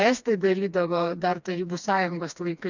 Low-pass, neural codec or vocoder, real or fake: 7.2 kHz; codec, 16 kHz, 2 kbps, FreqCodec, smaller model; fake